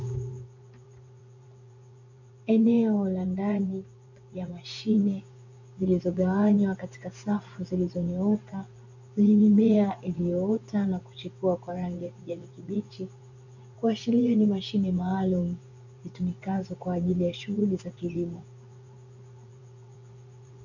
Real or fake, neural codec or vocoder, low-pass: fake; vocoder, 24 kHz, 100 mel bands, Vocos; 7.2 kHz